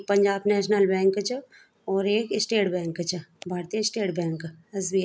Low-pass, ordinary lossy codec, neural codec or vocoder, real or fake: none; none; none; real